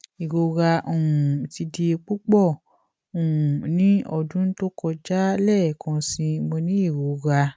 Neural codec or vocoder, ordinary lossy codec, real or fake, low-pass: none; none; real; none